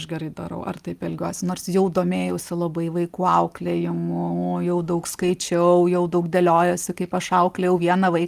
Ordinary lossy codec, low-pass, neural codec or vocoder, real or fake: Opus, 32 kbps; 14.4 kHz; none; real